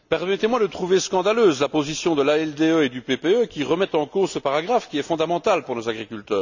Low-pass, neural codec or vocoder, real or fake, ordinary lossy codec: 7.2 kHz; none; real; none